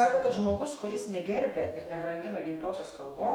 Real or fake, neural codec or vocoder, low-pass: fake; codec, 44.1 kHz, 2.6 kbps, DAC; 19.8 kHz